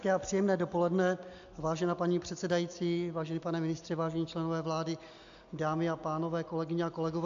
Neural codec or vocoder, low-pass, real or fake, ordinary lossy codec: none; 7.2 kHz; real; AAC, 64 kbps